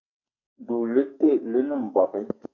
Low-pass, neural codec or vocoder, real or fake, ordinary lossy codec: 7.2 kHz; codec, 44.1 kHz, 2.6 kbps, SNAC; fake; MP3, 64 kbps